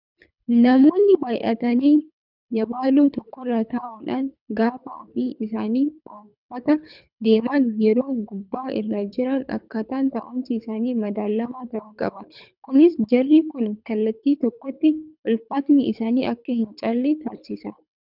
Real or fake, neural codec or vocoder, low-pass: fake; codec, 24 kHz, 3 kbps, HILCodec; 5.4 kHz